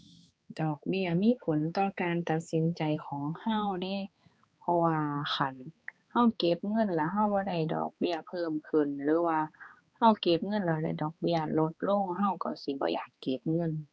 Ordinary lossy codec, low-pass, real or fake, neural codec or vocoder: none; none; fake; codec, 16 kHz, 4 kbps, X-Codec, HuBERT features, trained on balanced general audio